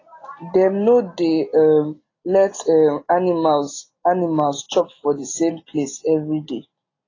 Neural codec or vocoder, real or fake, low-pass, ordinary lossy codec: none; real; 7.2 kHz; AAC, 32 kbps